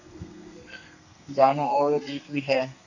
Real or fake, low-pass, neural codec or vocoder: fake; 7.2 kHz; codec, 32 kHz, 1.9 kbps, SNAC